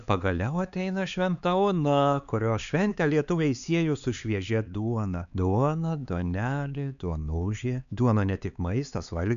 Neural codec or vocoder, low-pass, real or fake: codec, 16 kHz, 4 kbps, X-Codec, HuBERT features, trained on LibriSpeech; 7.2 kHz; fake